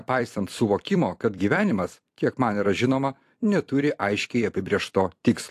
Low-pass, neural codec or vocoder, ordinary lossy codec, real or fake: 14.4 kHz; vocoder, 44.1 kHz, 128 mel bands every 256 samples, BigVGAN v2; AAC, 64 kbps; fake